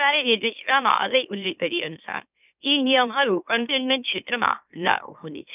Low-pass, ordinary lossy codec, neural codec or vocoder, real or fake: 3.6 kHz; none; autoencoder, 44.1 kHz, a latent of 192 numbers a frame, MeloTTS; fake